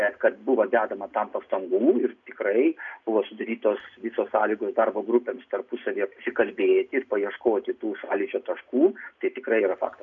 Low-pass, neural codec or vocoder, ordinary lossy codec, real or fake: 7.2 kHz; none; MP3, 64 kbps; real